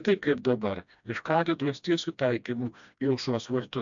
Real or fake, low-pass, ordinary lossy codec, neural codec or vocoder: fake; 7.2 kHz; AAC, 64 kbps; codec, 16 kHz, 1 kbps, FreqCodec, smaller model